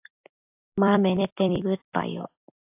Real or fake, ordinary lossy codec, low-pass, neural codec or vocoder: fake; AAC, 24 kbps; 3.6 kHz; vocoder, 44.1 kHz, 128 mel bands every 256 samples, BigVGAN v2